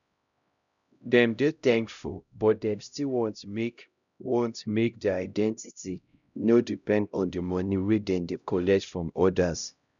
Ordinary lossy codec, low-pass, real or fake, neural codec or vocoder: none; 7.2 kHz; fake; codec, 16 kHz, 0.5 kbps, X-Codec, HuBERT features, trained on LibriSpeech